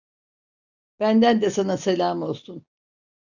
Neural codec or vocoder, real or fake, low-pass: none; real; 7.2 kHz